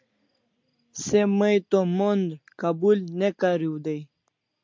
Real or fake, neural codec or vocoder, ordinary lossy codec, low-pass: real; none; MP3, 64 kbps; 7.2 kHz